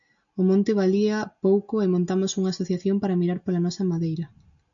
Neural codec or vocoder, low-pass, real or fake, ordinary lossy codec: none; 7.2 kHz; real; MP3, 96 kbps